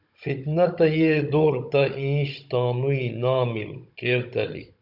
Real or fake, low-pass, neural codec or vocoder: fake; 5.4 kHz; codec, 16 kHz, 16 kbps, FunCodec, trained on Chinese and English, 50 frames a second